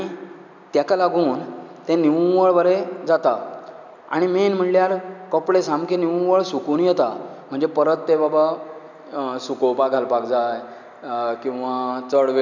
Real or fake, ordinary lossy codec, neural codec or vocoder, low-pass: real; none; none; 7.2 kHz